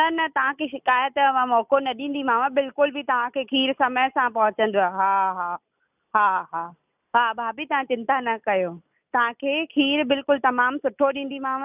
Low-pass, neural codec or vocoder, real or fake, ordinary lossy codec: 3.6 kHz; none; real; none